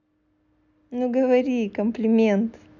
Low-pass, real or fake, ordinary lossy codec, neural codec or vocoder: 7.2 kHz; real; none; none